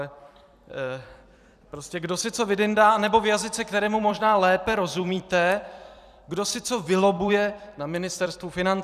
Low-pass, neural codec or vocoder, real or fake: 14.4 kHz; vocoder, 44.1 kHz, 128 mel bands every 256 samples, BigVGAN v2; fake